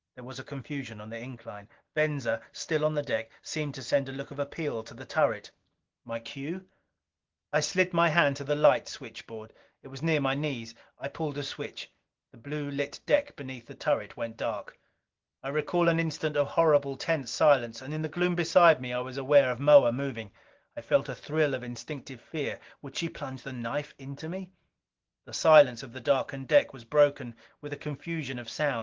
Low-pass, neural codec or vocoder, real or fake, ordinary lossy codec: 7.2 kHz; none; real; Opus, 16 kbps